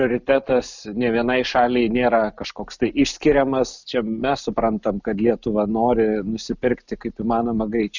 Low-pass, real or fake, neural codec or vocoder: 7.2 kHz; real; none